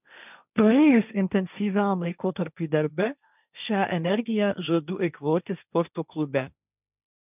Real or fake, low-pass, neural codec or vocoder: fake; 3.6 kHz; codec, 16 kHz, 1.1 kbps, Voila-Tokenizer